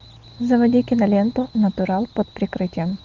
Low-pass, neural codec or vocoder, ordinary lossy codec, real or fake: 7.2 kHz; none; Opus, 24 kbps; real